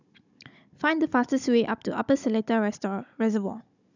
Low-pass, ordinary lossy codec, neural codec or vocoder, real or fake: 7.2 kHz; none; codec, 16 kHz, 16 kbps, FunCodec, trained on Chinese and English, 50 frames a second; fake